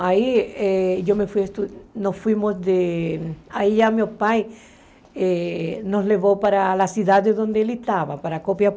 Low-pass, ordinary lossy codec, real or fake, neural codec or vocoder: none; none; real; none